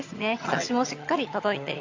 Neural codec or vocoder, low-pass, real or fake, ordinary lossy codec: vocoder, 22.05 kHz, 80 mel bands, HiFi-GAN; 7.2 kHz; fake; AAC, 48 kbps